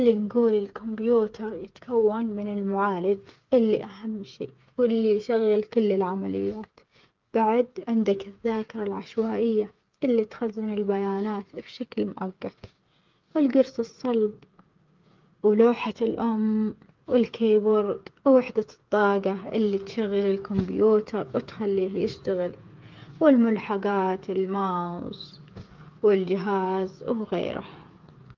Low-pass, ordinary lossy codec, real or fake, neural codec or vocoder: 7.2 kHz; Opus, 32 kbps; fake; codec, 16 kHz, 8 kbps, FreqCodec, smaller model